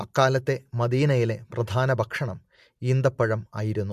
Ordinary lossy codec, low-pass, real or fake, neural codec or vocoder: MP3, 64 kbps; 14.4 kHz; real; none